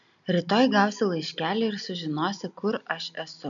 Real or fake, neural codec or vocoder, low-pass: real; none; 7.2 kHz